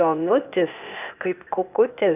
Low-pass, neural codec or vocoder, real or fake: 3.6 kHz; codec, 16 kHz, 0.8 kbps, ZipCodec; fake